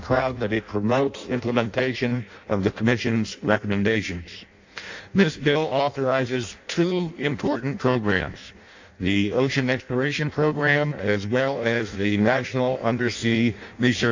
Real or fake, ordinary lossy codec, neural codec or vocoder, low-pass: fake; AAC, 48 kbps; codec, 16 kHz in and 24 kHz out, 0.6 kbps, FireRedTTS-2 codec; 7.2 kHz